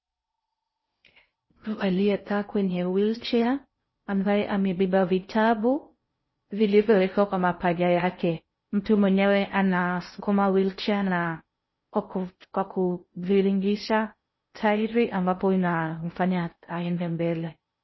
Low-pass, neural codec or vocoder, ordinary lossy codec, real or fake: 7.2 kHz; codec, 16 kHz in and 24 kHz out, 0.6 kbps, FocalCodec, streaming, 4096 codes; MP3, 24 kbps; fake